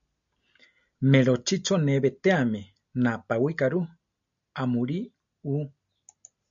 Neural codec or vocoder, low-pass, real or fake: none; 7.2 kHz; real